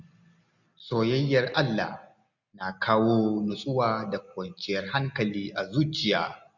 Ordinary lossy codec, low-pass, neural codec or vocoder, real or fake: none; 7.2 kHz; none; real